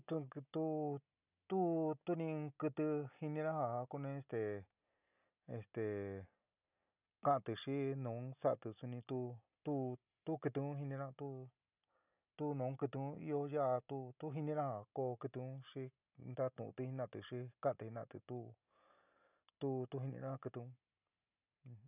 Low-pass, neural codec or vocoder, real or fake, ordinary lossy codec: 3.6 kHz; none; real; none